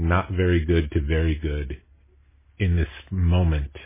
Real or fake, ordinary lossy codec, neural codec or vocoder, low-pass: real; MP3, 16 kbps; none; 3.6 kHz